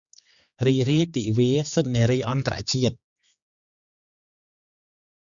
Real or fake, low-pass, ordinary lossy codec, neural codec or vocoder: fake; 7.2 kHz; Opus, 64 kbps; codec, 16 kHz, 4 kbps, X-Codec, HuBERT features, trained on general audio